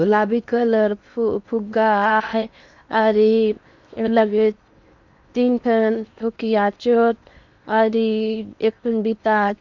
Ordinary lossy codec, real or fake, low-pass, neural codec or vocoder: Opus, 64 kbps; fake; 7.2 kHz; codec, 16 kHz in and 24 kHz out, 0.8 kbps, FocalCodec, streaming, 65536 codes